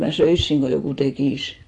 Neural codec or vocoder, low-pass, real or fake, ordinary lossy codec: vocoder, 24 kHz, 100 mel bands, Vocos; 10.8 kHz; fake; AAC, 48 kbps